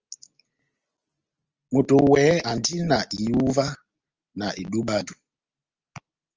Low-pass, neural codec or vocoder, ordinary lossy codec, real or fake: 7.2 kHz; none; Opus, 24 kbps; real